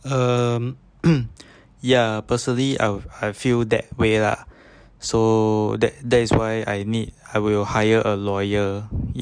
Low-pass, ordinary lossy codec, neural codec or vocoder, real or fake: 9.9 kHz; none; none; real